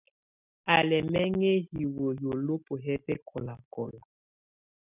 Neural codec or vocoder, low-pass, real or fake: none; 3.6 kHz; real